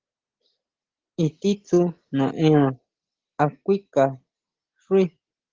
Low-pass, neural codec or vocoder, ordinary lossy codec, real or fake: 7.2 kHz; none; Opus, 16 kbps; real